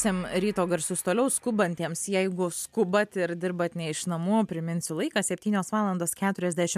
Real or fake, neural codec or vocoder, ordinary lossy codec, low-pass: real; none; MP3, 96 kbps; 14.4 kHz